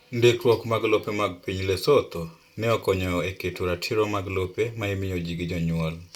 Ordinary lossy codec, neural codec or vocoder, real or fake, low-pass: Opus, 64 kbps; none; real; 19.8 kHz